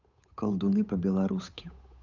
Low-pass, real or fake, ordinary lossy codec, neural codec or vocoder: 7.2 kHz; fake; none; codec, 16 kHz, 8 kbps, FunCodec, trained on Chinese and English, 25 frames a second